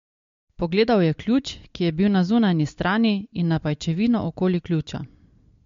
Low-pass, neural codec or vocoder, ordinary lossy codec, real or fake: 7.2 kHz; none; MP3, 48 kbps; real